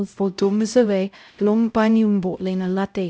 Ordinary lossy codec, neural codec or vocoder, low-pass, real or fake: none; codec, 16 kHz, 0.5 kbps, X-Codec, HuBERT features, trained on LibriSpeech; none; fake